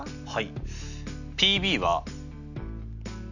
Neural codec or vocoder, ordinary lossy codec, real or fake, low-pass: none; none; real; 7.2 kHz